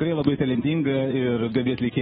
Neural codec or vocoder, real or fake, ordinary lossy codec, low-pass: codec, 24 kHz, 3.1 kbps, DualCodec; fake; AAC, 16 kbps; 10.8 kHz